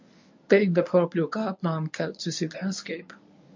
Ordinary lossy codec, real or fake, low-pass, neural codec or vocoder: MP3, 48 kbps; fake; 7.2 kHz; codec, 24 kHz, 0.9 kbps, WavTokenizer, medium speech release version 1